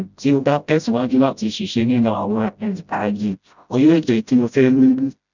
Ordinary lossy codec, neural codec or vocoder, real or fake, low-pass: none; codec, 16 kHz, 0.5 kbps, FreqCodec, smaller model; fake; 7.2 kHz